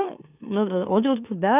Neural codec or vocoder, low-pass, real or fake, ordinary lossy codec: autoencoder, 44.1 kHz, a latent of 192 numbers a frame, MeloTTS; 3.6 kHz; fake; none